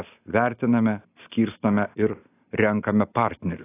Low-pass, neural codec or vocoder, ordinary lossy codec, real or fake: 3.6 kHz; none; AAC, 24 kbps; real